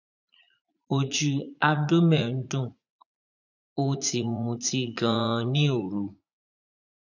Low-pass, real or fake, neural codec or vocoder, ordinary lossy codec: 7.2 kHz; fake; vocoder, 44.1 kHz, 80 mel bands, Vocos; none